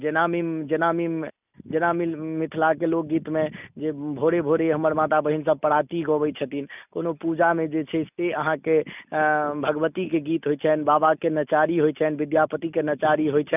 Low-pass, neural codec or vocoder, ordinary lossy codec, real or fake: 3.6 kHz; none; none; real